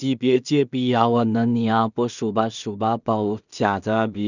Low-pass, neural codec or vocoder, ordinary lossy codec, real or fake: 7.2 kHz; codec, 16 kHz in and 24 kHz out, 0.4 kbps, LongCat-Audio-Codec, two codebook decoder; none; fake